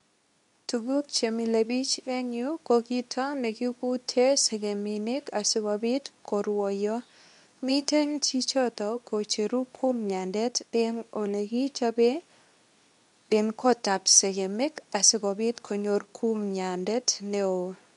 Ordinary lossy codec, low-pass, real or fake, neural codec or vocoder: none; 10.8 kHz; fake; codec, 24 kHz, 0.9 kbps, WavTokenizer, medium speech release version 2